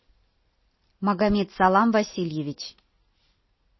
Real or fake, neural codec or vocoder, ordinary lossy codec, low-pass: real; none; MP3, 24 kbps; 7.2 kHz